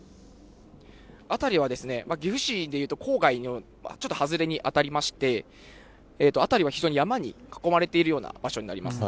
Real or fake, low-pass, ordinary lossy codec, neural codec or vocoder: real; none; none; none